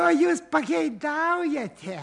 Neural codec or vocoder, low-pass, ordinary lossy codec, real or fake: none; 10.8 kHz; MP3, 96 kbps; real